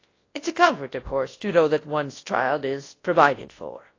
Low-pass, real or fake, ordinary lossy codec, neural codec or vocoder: 7.2 kHz; fake; AAC, 32 kbps; codec, 24 kHz, 0.9 kbps, WavTokenizer, large speech release